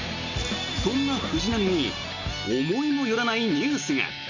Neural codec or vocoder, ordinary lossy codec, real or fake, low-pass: none; none; real; 7.2 kHz